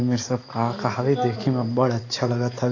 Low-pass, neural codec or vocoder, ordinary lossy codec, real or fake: 7.2 kHz; none; AAC, 32 kbps; real